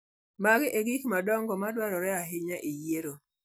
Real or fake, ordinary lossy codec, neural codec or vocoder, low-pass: real; none; none; none